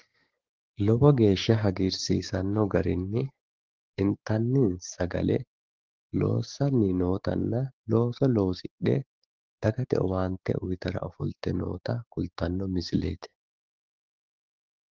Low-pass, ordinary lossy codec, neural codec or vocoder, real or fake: 7.2 kHz; Opus, 16 kbps; codec, 16 kHz, 6 kbps, DAC; fake